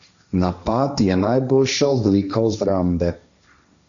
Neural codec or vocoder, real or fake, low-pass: codec, 16 kHz, 1.1 kbps, Voila-Tokenizer; fake; 7.2 kHz